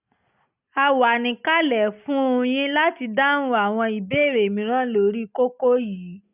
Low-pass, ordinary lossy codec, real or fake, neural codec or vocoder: 3.6 kHz; none; real; none